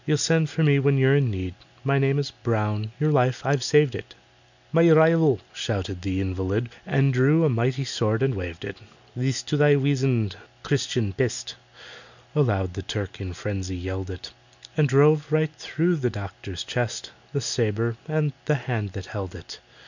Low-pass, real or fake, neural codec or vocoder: 7.2 kHz; real; none